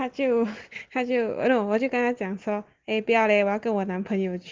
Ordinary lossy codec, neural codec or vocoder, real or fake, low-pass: Opus, 16 kbps; none; real; 7.2 kHz